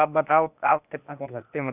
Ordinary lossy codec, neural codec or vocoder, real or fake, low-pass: none; codec, 16 kHz, 0.8 kbps, ZipCodec; fake; 3.6 kHz